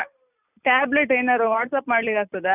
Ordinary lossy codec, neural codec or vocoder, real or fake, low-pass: none; none; real; 3.6 kHz